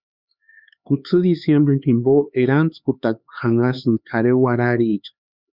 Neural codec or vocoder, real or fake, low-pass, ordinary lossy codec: codec, 16 kHz, 4 kbps, X-Codec, HuBERT features, trained on LibriSpeech; fake; 5.4 kHz; Opus, 64 kbps